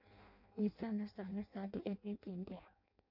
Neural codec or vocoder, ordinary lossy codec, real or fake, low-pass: codec, 16 kHz in and 24 kHz out, 0.6 kbps, FireRedTTS-2 codec; AAC, 48 kbps; fake; 5.4 kHz